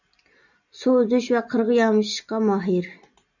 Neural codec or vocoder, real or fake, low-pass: none; real; 7.2 kHz